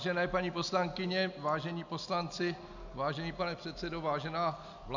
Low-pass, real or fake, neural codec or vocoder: 7.2 kHz; real; none